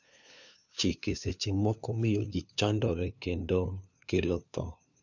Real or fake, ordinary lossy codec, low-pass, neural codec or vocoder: fake; none; 7.2 kHz; codec, 16 kHz, 2 kbps, FunCodec, trained on LibriTTS, 25 frames a second